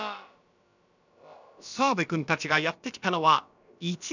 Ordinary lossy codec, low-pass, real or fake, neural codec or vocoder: none; 7.2 kHz; fake; codec, 16 kHz, about 1 kbps, DyCAST, with the encoder's durations